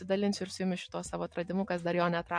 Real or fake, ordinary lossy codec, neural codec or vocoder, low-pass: real; MP3, 48 kbps; none; 9.9 kHz